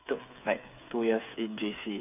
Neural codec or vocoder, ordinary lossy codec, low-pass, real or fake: codec, 16 kHz, 8 kbps, FreqCodec, smaller model; none; 3.6 kHz; fake